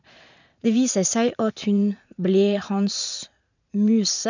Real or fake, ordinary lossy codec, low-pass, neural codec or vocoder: fake; none; 7.2 kHz; vocoder, 44.1 kHz, 80 mel bands, Vocos